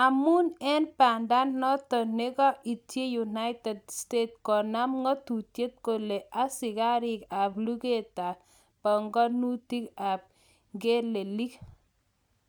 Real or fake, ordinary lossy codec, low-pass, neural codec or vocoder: real; none; none; none